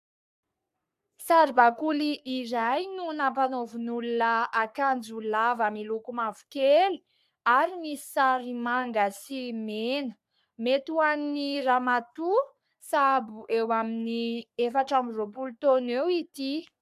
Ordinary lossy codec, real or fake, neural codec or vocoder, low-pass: AAC, 96 kbps; fake; codec, 44.1 kHz, 3.4 kbps, Pupu-Codec; 14.4 kHz